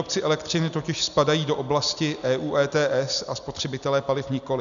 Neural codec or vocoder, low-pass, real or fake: none; 7.2 kHz; real